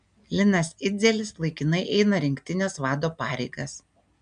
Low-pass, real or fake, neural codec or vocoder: 9.9 kHz; real; none